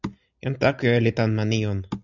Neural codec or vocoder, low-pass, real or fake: none; 7.2 kHz; real